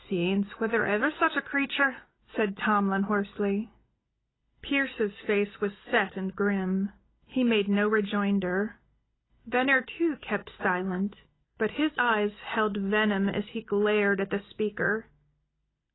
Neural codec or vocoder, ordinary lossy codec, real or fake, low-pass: none; AAC, 16 kbps; real; 7.2 kHz